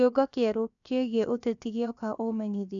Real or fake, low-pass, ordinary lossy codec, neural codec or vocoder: fake; 7.2 kHz; none; codec, 16 kHz, 0.7 kbps, FocalCodec